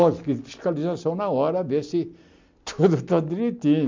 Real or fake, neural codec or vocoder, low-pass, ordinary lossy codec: real; none; 7.2 kHz; MP3, 64 kbps